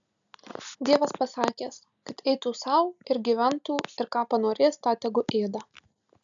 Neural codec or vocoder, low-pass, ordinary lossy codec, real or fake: none; 7.2 kHz; MP3, 96 kbps; real